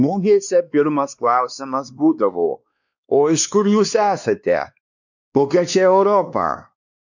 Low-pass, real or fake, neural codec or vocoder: 7.2 kHz; fake; codec, 16 kHz, 2 kbps, X-Codec, WavLM features, trained on Multilingual LibriSpeech